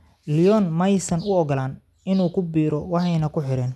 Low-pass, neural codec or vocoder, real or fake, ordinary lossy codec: none; none; real; none